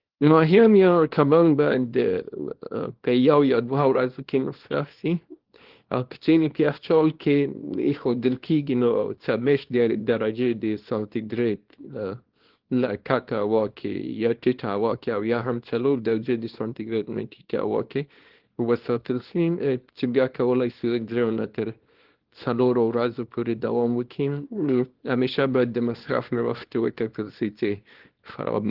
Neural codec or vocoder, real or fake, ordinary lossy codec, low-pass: codec, 24 kHz, 0.9 kbps, WavTokenizer, small release; fake; Opus, 16 kbps; 5.4 kHz